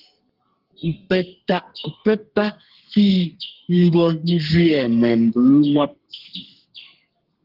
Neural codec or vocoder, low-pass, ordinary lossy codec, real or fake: codec, 32 kHz, 1.9 kbps, SNAC; 5.4 kHz; Opus, 16 kbps; fake